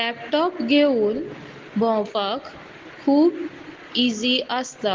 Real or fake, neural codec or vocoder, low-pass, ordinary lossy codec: real; none; 7.2 kHz; Opus, 16 kbps